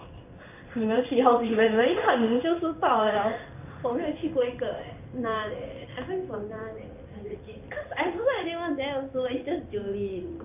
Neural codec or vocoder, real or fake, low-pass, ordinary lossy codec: codec, 16 kHz in and 24 kHz out, 1 kbps, XY-Tokenizer; fake; 3.6 kHz; none